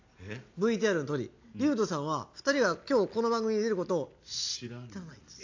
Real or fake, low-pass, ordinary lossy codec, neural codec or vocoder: real; 7.2 kHz; AAC, 48 kbps; none